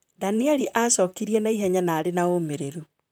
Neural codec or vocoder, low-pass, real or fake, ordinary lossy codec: vocoder, 44.1 kHz, 128 mel bands, Pupu-Vocoder; none; fake; none